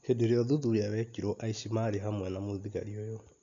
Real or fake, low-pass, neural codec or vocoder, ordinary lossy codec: real; 7.2 kHz; none; AAC, 64 kbps